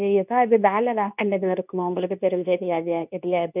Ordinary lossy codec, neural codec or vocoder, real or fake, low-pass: none; codec, 24 kHz, 0.9 kbps, WavTokenizer, medium speech release version 2; fake; 3.6 kHz